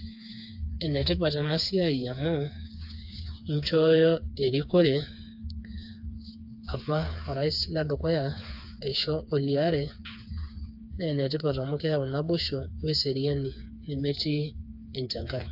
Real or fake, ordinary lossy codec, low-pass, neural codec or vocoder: fake; AAC, 48 kbps; 5.4 kHz; codec, 16 kHz, 4 kbps, FreqCodec, smaller model